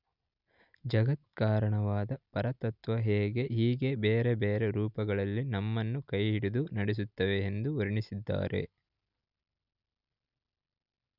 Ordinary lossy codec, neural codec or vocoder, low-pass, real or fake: none; none; 5.4 kHz; real